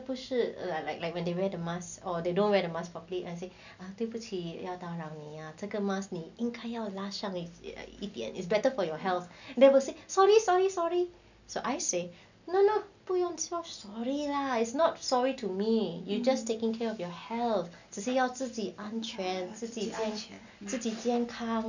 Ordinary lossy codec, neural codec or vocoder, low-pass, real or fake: none; none; 7.2 kHz; real